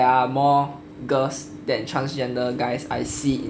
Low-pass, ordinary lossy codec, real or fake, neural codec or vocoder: none; none; real; none